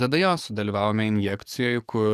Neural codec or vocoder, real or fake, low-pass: codec, 44.1 kHz, 7.8 kbps, DAC; fake; 14.4 kHz